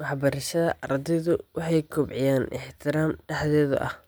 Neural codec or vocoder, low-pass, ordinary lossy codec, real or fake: none; none; none; real